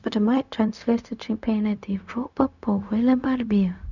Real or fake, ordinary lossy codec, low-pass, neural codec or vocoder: fake; none; 7.2 kHz; codec, 16 kHz, 0.4 kbps, LongCat-Audio-Codec